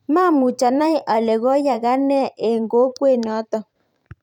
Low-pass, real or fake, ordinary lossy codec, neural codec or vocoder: 19.8 kHz; fake; none; vocoder, 44.1 kHz, 128 mel bands every 512 samples, BigVGAN v2